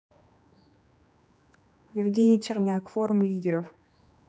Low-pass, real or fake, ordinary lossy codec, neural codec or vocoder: none; fake; none; codec, 16 kHz, 2 kbps, X-Codec, HuBERT features, trained on general audio